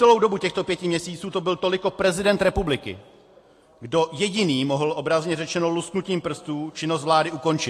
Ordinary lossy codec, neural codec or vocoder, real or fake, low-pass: AAC, 48 kbps; none; real; 14.4 kHz